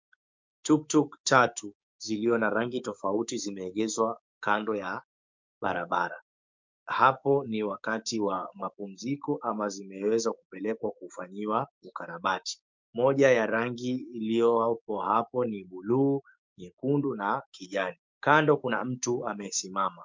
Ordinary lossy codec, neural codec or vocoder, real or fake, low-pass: MP3, 64 kbps; codec, 16 kHz, 6 kbps, DAC; fake; 7.2 kHz